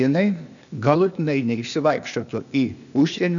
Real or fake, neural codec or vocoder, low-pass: fake; codec, 16 kHz, 0.8 kbps, ZipCodec; 7.2 kHz